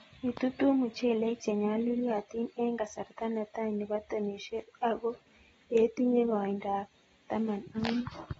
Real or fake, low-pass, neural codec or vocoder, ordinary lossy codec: real; 19.8 kHz; none; AAC, 24 kbps